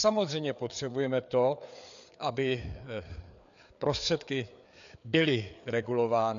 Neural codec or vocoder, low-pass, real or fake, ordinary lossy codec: codec, 16 kHz, 4 kbps, FreqCodec, larger model; 7.2 kHz; fake; MP3, 96 kbps